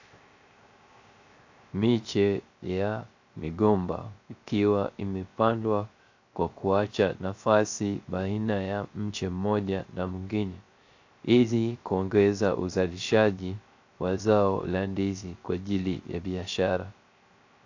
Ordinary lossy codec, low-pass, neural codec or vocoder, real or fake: AAC, 48 kbps; 7.2 kHz; codec, 16 kHz, 0.3 kbps, FocalCodec; fake